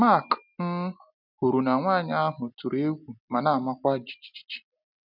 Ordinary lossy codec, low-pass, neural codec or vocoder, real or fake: none; 5.4 kHz; none; real